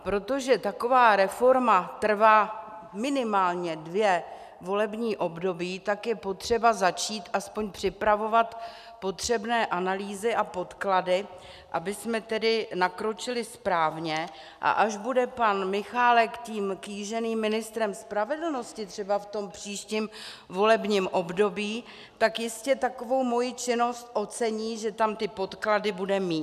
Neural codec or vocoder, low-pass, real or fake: none; 14.4 kHz; real